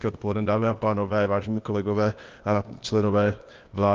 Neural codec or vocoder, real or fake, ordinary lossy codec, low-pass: codec, 16 kHz, 0.8 kbps, ZipCodec; fake; Opus, 24 kbps; 7.2 kHz